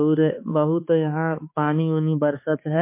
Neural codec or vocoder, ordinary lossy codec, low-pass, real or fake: codec, 16 kHz, 4 kbps, X-Codec, HuBERT features, trained on balanced general audio; MP3, 24 kbps; 3.6 kHz; fake